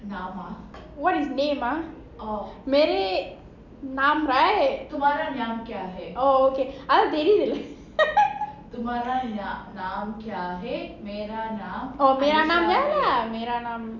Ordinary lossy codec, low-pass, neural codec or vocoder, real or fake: none; 7.2 kHz; none; real